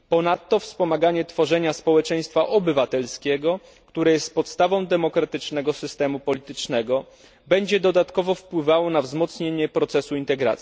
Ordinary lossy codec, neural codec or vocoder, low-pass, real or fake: none; none; none; real